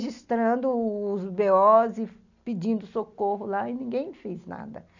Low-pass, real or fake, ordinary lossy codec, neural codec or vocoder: 7.2 kHz; real; none; none